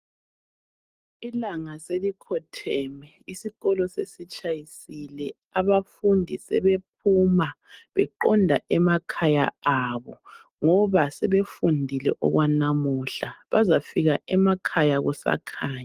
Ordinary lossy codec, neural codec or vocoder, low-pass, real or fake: Opus, 24 kbps; none; 14.4 kHz; real